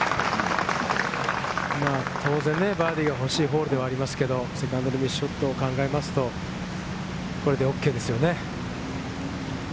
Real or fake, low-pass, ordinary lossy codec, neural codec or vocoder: real; none; none; none